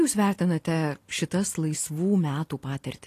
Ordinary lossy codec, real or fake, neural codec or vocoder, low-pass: AAC, 48 kbps; real; none; 14.4 kHz